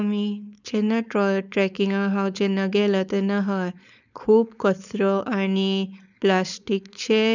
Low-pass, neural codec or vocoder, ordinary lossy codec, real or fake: 7.2 kHz; codec, 16 kHz, 4.8 kbps, FACodec; none; fake